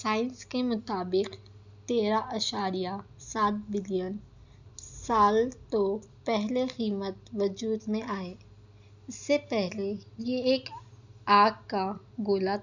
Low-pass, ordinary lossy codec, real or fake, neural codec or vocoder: 7.2 kHz; none; real; none